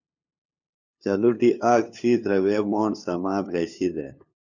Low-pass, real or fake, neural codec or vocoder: 7.2 kHz; fake; codec, 16 kHz, 2 kbps, FunCodec, trained on LibriTTS, 25 frames a second